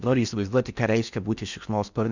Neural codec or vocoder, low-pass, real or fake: codec, 16 kHz in and 24 kHz out, 0.6 kbps, FocalCodec, streaming, 4096 codes; 7.2 kHz; fake